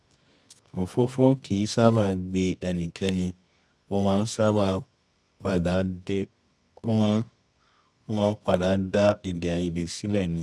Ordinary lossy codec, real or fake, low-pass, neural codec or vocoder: none; fake; none; codec, 24 kHz, 0.9 kbps, WavTokenizer, medium music audio release